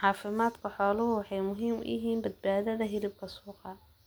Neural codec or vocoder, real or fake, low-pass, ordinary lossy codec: none; real; none; none